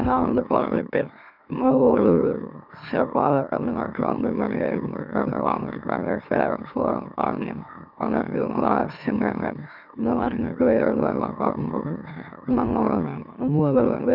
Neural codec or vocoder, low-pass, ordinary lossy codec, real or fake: autoencoder, 44.1 kHz, a latent of 192 numbers a frame, MeloTTS; 5.4 kHz; none; fake